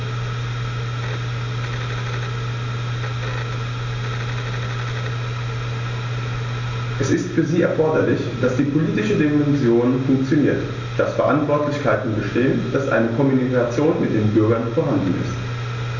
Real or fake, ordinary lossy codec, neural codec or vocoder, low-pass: real; MP3, 64 kbps; none; 7.2 kHz